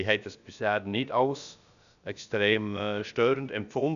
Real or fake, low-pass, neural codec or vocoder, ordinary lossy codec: fake; 7.2 kHz; codec, 16 kHz, about 1 kbps, DyCAST, with the encoder's durations; MP3, 96 kbps